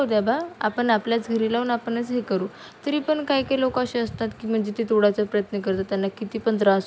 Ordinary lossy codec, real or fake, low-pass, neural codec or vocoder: none; real; none; none